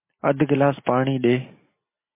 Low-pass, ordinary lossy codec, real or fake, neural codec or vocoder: 3.6 kHz; MP3, 24 kbps; real; none